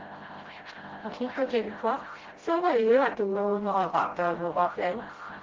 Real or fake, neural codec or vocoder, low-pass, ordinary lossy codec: fake; codec, 16 kHz, 0.5 kbps, FreqCodec, smaller model; 7.2 kHz; Opus, 16 kbps